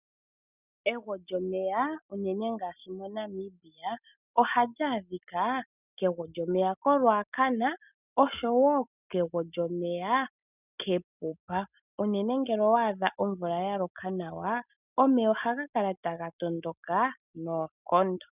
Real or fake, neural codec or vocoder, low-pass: real; none; 3.6 kHz